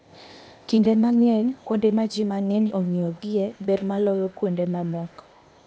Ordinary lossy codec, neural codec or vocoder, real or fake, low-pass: none; codec, 16 kHz, 0.8 kbps, ZipCodec; fake; none